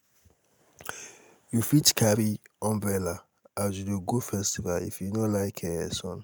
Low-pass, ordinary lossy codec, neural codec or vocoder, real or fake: none; none; none; real